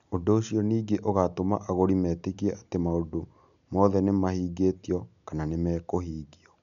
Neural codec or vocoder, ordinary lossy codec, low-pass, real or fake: none; none; 7.2 kHz; real